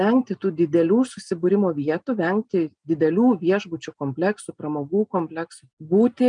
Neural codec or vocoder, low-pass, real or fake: none; 9.9 kHz; real